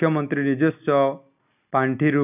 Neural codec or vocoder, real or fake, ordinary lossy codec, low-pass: none; real; none; 3.6 kHz